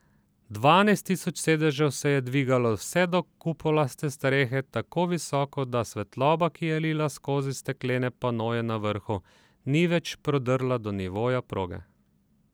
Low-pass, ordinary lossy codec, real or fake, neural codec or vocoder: none; none; fake; vocoder, 44.1 kHz, 128 mel bands every 256 samples, BigVGAN v2